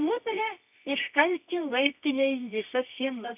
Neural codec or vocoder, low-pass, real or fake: codec, 24 kHz, 0.9 kbps, WavTokenizer, medium music audio release; 3.6 kHz; fake